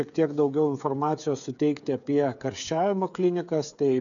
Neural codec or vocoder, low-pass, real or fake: codec, 16 kHz, 8 kbps, FreqCodec, smaller model; 7.2 kHz; fake